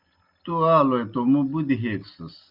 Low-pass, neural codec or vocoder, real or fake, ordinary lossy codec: 5.4 kHz; none; real; Opus, 24 kbps